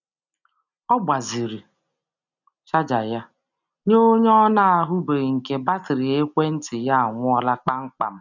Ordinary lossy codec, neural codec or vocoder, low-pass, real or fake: none; none; 7.2 kHz; real